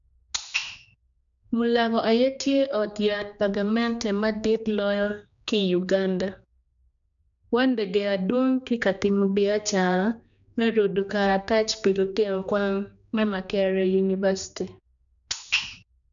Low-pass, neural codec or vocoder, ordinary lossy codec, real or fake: 7.2 kHz; codec, 16 kHz, 2 kbps, X-Codec, HuBERT features, trained on general audio; none; fake